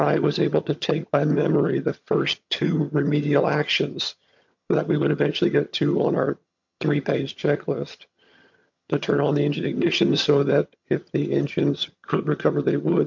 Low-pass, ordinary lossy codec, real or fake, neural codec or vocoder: 7.2 kHz; MP3, 48 kbps; fake; vocoder, 22.05 kHz, 80 mel bands, HiFi-GAN